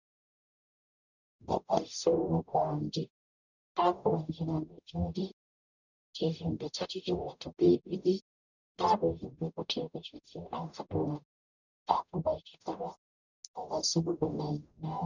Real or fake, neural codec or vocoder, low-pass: fake; codec, 44.1 kHz, 0.9 kbps, DAC; 7.2 kHz